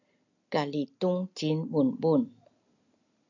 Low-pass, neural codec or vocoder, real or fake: 7.2 kHz; none; real